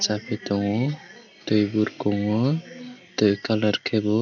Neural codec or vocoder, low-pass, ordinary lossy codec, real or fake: none; 7.2 kHz; none; real